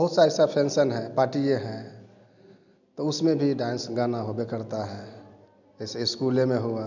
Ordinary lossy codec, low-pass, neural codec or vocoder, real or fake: none; 7.2 kHz; none; real